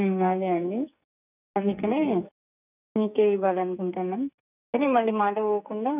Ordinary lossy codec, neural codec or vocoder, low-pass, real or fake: none; codec, 44.1 kHz, 2.6 kbps, SNAC; 3.6 kHz; fake